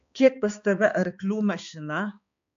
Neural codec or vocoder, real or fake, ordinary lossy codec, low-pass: codec, 16 kHz, 2 kbps, X-Codec, HuBERT features, trained on balanced general audio; fake; AAC, 64 kbps; 7.2 kHz